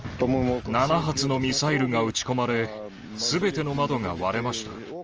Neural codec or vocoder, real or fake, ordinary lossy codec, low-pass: none; real; Opus, 24 kbps; 7.2 kHz